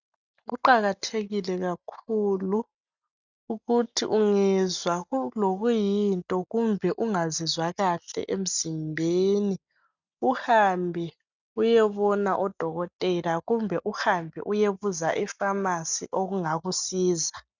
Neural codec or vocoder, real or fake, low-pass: none; real; 7.2 kHz